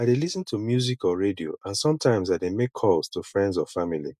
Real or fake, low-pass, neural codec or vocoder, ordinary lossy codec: real; 14.4 kHz; none; none